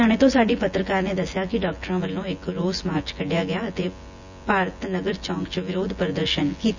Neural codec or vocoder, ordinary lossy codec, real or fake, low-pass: vocoder, 24 kHz, 100 mel bands, Vocos; none; fake; 7.2 kHz